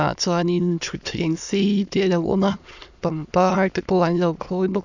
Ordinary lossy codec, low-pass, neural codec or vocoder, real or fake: none; 7.2 kHz; autoencoder, 22.05 kHz, a latent of 192 numbers a frame, VITS, trained on many speakers; fake